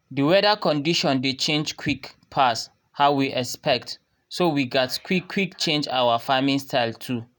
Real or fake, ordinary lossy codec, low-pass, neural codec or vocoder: real; none; none; none